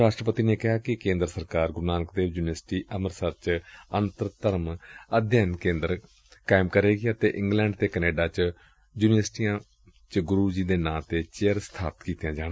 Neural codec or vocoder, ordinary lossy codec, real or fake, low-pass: none; none; real; none